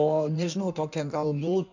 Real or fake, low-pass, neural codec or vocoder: fake; 7.2 kHz; codec, 16 kHz in and 24 kHz out, 1.1 kbps, FireRedTTS-2 codec